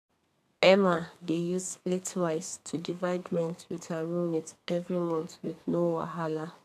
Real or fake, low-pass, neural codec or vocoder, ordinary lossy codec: fake; 14.4 kHz; codec, 32 kHz, 1.9 kbps, SNAC; none